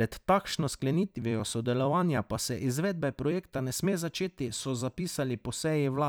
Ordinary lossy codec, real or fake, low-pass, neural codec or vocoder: none; fake; none; vocoder, 44.1 kHz, 128 mel bands every 256 samples, BigVGAN v2